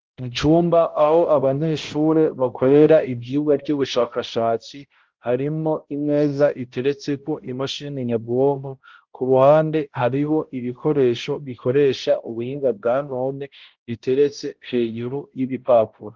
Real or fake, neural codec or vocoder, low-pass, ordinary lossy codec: fake; codec, 16 kHz, 0.5 kbps, X-Codec, HuBERT features, trained on balanced general audio; 7.2 kHz; Opus, 16 kbps